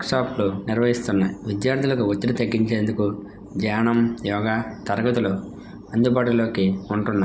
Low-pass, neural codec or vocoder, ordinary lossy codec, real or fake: none; none; none; real